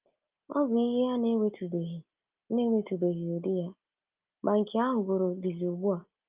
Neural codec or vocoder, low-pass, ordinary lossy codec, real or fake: none; 3.6 kHz; Opus, 24 kbps; real